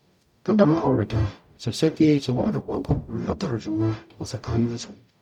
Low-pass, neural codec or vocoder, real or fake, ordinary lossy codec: 19.8 kHz; codec, 44.1 kHz, 0.9 kbps, DAC; fake; none